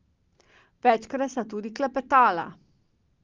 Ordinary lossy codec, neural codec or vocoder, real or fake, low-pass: Opus, 32 kbps; none; real; 7.2 kHz